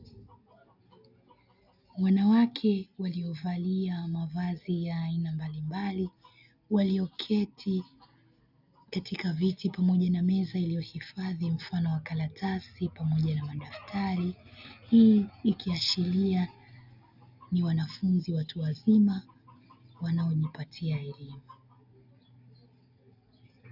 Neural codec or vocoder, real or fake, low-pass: none; real; 5.4 kHz